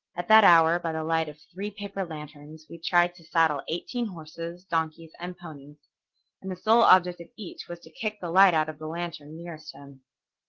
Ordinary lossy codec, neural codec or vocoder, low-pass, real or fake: Opus, 16 kbps; none; 7.2 kHz; real